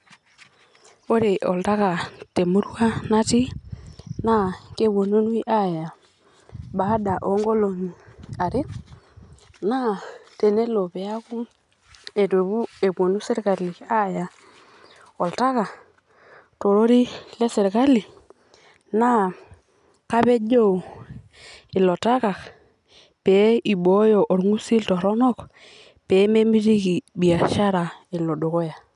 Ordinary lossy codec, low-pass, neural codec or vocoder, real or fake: none; 10.8 kHz; none; real